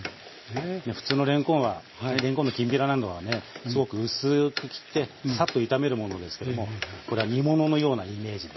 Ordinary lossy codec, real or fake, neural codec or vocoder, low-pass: MP3, 24 kbps; real; none; 7.2 kHz